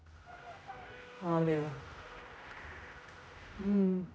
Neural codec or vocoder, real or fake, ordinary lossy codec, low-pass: codec, 16 kHz, 0.5 kbps, X-Codec, HuBERT features, trained on general audio; fake; none; none